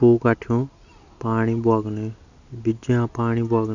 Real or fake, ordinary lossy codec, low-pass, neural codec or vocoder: real; none; 7.2 kHz; none